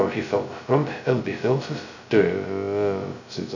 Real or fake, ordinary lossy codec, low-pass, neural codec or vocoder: fake; none; 7.2 kHz; codec, 16 kHz, 0.2 kbps, FocalCodec